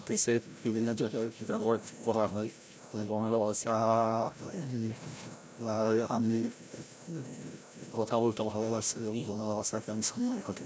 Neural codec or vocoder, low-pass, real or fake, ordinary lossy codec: codec, 16 kHz, 0.5 kbps, FreqCodec, larger model; none; fake; none